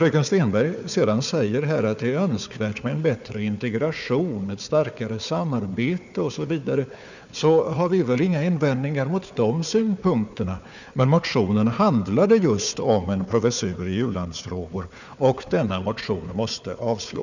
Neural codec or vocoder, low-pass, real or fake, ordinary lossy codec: codec, 16 kHz, 4 kbps, FunCodec, trained on Chinese and English, 50 frames a second; 7.2 kHz; fake; none